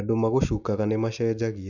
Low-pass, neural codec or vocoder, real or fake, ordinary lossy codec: 7.2 kHz; none; real; none